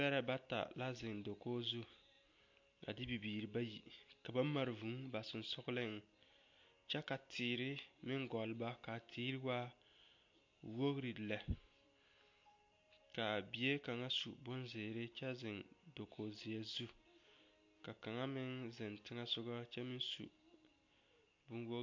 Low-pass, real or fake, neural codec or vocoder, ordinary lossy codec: 7.2 kHz; real; none; MP3, 48 kbps